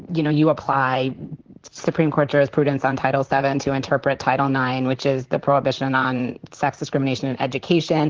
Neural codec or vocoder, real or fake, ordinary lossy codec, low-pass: vocoder, 44.1 kHz, 128 mel bands, Pupu-Vocoder; fake; Opus, 16 kbps; 7.2 kHz